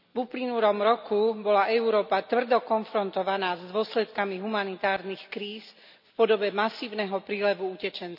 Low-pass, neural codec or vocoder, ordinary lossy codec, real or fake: 5.4 kHz; none; none; real